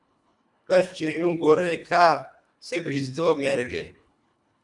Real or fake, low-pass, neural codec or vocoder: fake; 10.8 kHz; codec, 24 kHz, 1.5 kbps, HILCodec